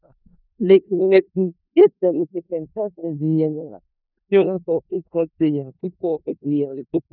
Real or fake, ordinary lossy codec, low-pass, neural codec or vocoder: fake; none; 5.4 kHz; codec, 16 kHz in and 24 kHz out, 0.4 kbps, LongCat-Audio-Codec, four codebook decoder